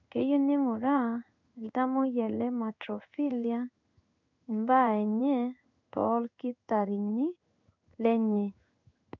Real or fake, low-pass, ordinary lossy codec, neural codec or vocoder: fake; 7.2 kHz; MP3, 64 kbps; codec, 16 kHz in and 24 kHz out, 1 kbps, XY-Tokenizer